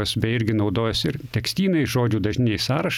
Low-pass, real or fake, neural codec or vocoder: 19.8 kHz; real; none